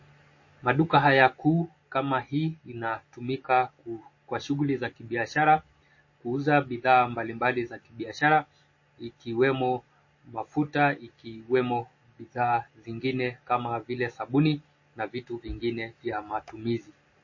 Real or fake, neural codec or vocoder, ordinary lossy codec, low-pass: real; none; MP3, 32 kbps; 7.2 kHz